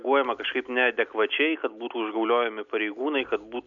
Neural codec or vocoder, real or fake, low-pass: none; real; 7.2 kHz